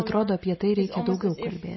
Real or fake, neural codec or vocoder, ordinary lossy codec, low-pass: real; none; MP3, 24 kbps; 7.2 kHz